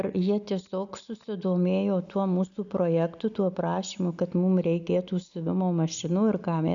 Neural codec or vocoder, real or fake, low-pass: none; real; 7.2 kHz